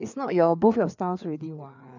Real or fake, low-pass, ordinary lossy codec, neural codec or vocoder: fake; 7.2 kHz; none; codec, 16 kHz, 4 kbps, FreqCodec, larger model